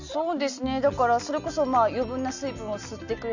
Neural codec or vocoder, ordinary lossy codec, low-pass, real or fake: none; none; 7.2 kHz; real